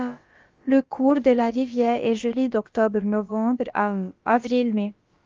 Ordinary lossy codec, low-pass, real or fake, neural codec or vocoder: Opus, 32 kbps; 7.2 kHz; fake; codec, 16 kHz, about 1 kbps, DyCAST, with the encoder's durations